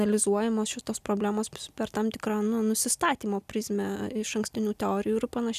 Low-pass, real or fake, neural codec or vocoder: 14.4 kHz; real; none